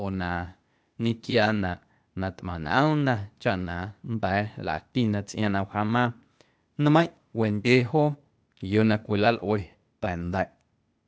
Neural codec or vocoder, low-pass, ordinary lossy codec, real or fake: codec, 16 kHz, 0.8 kbps, ZipCodec; none; none; fake